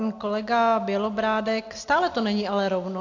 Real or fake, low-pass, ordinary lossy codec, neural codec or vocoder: real; 7.2 kHz; AAC, 48 kbps; none